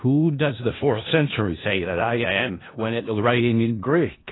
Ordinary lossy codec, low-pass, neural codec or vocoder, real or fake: AAC, 16 kbps; 7.2 kHz; codec, 16 kHz in and 24 kHz out, 0.4 kbps, LongCat-Audio-Codec, four codebook decoder; fake